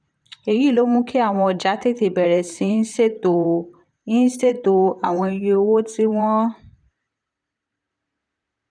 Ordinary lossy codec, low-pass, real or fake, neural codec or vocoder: none; 9.9 kHz; fake; vocoder, 44.1 kHz, 128 mel bands, Pupu-Vocoder